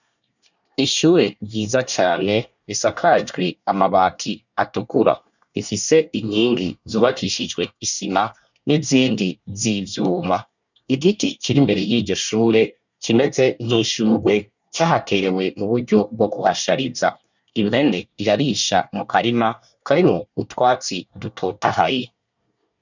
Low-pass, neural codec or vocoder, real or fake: 7.2 kHz; codec, 24 kHz, 1 kbps, SNAC; fake